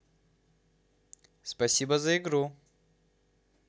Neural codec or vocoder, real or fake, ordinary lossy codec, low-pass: none; real; none; none